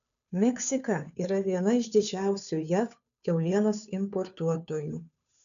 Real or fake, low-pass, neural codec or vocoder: fake; 7.2 kHz; codec, 16 kHz, 2 kbps, FunCodec, trained on Chinese and English, 25 frames a second